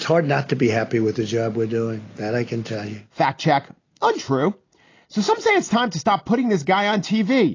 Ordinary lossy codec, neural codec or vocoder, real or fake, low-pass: AAC, 32 kbps; none; real; 7.2 kHz